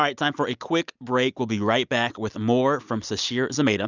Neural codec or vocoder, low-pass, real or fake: none; 7.2 kHz; real